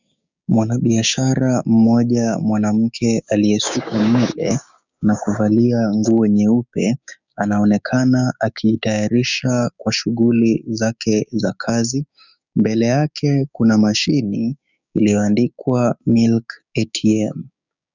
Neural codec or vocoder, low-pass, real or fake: codec, 16 kHz, 6 kbps, DAC; 7.2 kHz; fake